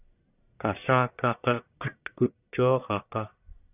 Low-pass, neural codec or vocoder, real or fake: 3.6 kHz; codec, 44.1 kHz, 1.7 kbps, Pupu-Codec; fake